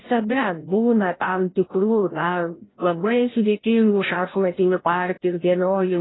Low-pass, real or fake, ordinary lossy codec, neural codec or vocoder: 7.2 kHz; fake; AAC, 16 kbps; codec, 16 kHz, 0.5 kbps, FreqCodec, larger model